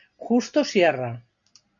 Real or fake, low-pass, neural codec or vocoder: real; 7.2 kHz; none